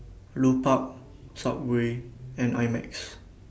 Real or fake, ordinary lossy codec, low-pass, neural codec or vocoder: real; none; none; none